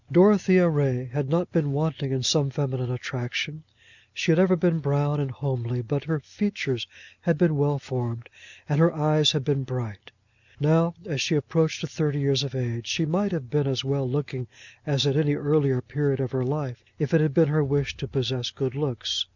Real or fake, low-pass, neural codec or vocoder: real; 7.2 kHz; none